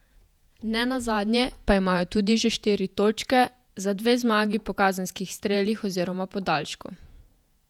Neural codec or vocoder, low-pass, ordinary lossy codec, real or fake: vocoder, 48 kHz, 128 mel bands, Vocos; 19.8 kHz; none; fake